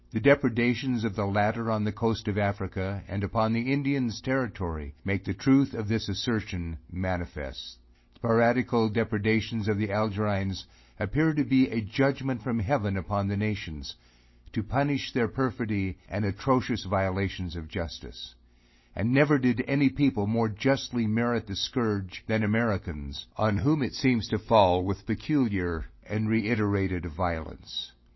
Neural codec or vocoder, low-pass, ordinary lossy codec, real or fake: none; 7.2 kHz; MP3, 24 kbps; real